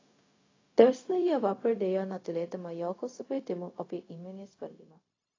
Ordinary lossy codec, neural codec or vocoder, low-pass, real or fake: AAC, 32 kbps; codec, 16 kHz, 0.4 kbps, LongCat-Audio-Codec; 7.2 kHz; fake